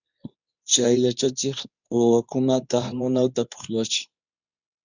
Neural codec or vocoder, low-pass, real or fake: codec, 24 kHz, 0.9 kbps, WavTokenizer, medium speech release version 2; 7.2 kHz; fake